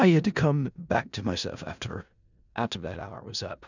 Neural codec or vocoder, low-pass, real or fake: codec, 16 kHz in and 24 kHz out, 0.4 kbps, LongCat-Audio-Codec, four codebook decoder; 7.2 kHz; fake